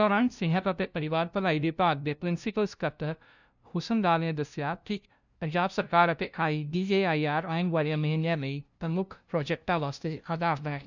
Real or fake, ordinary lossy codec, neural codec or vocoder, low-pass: fake; none; codec, 16 kHz, 0.5 kbps, FunCodec, trained on LibriTTS, 25 frames a second; 7.2 kHz